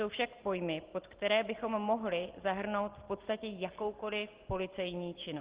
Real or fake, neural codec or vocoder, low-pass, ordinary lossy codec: real; none; 3.6 kHz; Opus, 16 kbps